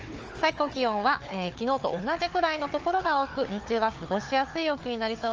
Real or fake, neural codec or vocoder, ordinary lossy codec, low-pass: fake; codec, 16 kHz, 4 kbps, FunCodec, trained on Chinese and English, 50 frames a second; Opus, 24 kbps; 7.2 kHz